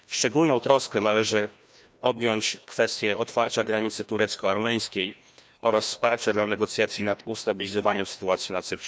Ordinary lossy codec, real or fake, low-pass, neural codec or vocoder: none; fake; none; codec, 16 kHz, 1 kbps, FreqCodec, larger model